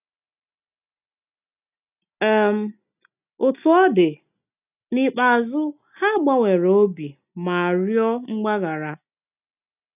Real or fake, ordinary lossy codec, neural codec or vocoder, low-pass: real; none; none; 3.6 kHz